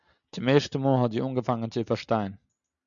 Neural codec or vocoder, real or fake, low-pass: none; real; 7.2 kHz